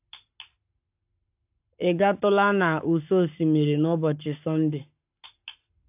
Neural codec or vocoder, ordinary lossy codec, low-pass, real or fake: codec, 44.1 kHz, 7.8 kbps, Pupu-Codec; AAC, 32 kbps; 3.6 kHz; fake